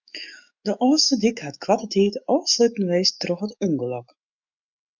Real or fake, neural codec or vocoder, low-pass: fake; autoencoder, 48 kHz, 128 numbers a frame, DAC-VAE, trained on Japanese speech; 7.2 kHz